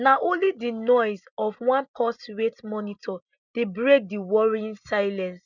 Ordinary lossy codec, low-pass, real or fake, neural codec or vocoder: none; 7.2 kHz; real; none